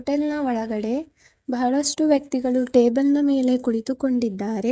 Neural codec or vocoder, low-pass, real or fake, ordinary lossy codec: codec, 16 kHz, 16 kbps, FreqCodec, smaller model; none; fake; none